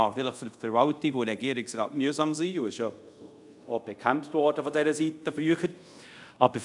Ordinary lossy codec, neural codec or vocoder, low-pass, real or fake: none; codec, 24 kHz, 0.5 kbps, DualCodec; 10.8 kHz; fake